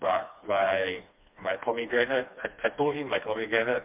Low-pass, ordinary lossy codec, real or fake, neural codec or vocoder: 3.6 kHz; MP3, 32 kbps; fake; codec, 16 kHz, 2 kbps, FreqCodec, smaller model